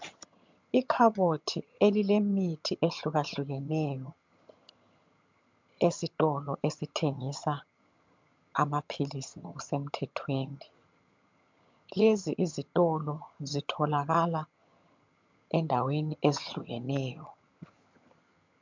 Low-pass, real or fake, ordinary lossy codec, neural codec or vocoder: 7.2 kHz; fake; MP3, 64 kbps; vocoder, 22.05 kHz, 80 mel bands, HiFi-GAN